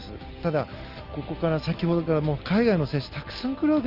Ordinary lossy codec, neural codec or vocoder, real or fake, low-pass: Opus, 24 kbps; none; real; 5.4 kHz